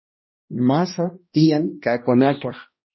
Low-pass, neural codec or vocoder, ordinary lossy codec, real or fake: 7.2 kHz; codec, 16 kHz, 1 kbps, X-Codec, HuBERT features, trained on balanced general audio; MP3, 24 kbps; fake